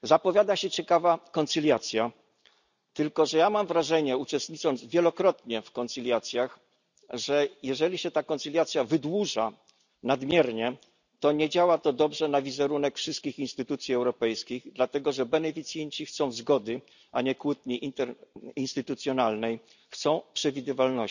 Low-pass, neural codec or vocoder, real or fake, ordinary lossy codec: 7.2 kHz; none; real; none